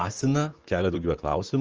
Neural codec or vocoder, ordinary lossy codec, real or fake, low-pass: vocoder, 44.1 kHz, 128 mel bands, Pupu-Vocoder; Opus, 24 kbps; fake; 7.2 kHz